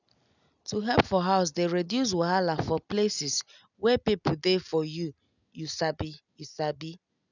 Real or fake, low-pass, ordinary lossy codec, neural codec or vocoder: real; 7.2 kHz; none; none